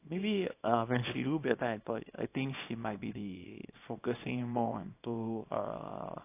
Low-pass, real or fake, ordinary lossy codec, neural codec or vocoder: 3.6 kHz; fake; AAC, 24 kbps; codec, 24 kHz, 0.9 kbps, WavTokenizer, small release